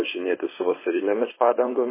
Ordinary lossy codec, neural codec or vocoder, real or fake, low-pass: MP3, 16 kbps; codec, 16 kHz, 4.8 kbps, FACodec; fake; 3.6 kHz